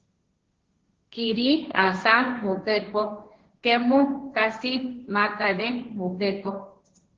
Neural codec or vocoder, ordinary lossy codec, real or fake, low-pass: codec, 16 kHz, 1.1 kbps, Voila-Tokenizer; Opus, 16 kbps; fake; 7.2 kHz